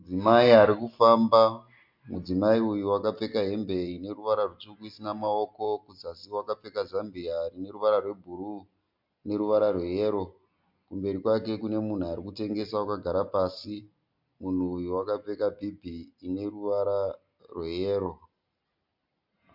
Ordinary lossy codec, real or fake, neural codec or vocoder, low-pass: MP3, 48 kbps; real; none; 5.4 kHz